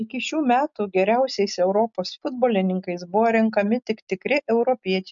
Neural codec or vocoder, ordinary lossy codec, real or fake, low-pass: none; MP3, 64 kbps; real; 7.2 kHz